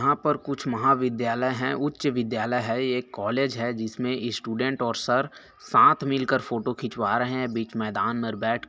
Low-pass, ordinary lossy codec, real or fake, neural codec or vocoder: none; none; real; none